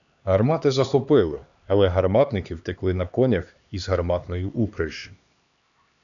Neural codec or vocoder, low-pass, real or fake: codec, 16 kHz, 2 kbps, X-Codec, HuBERT features, trained on LibriSpeech; 7.2 kHz; fake